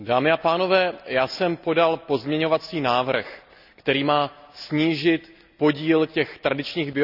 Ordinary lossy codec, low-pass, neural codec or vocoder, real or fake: none; 5.4 kHz; none; real